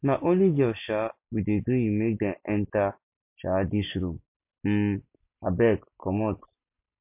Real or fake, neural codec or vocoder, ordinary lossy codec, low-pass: real; none; MP3, 32 kbps; 3.6 kHz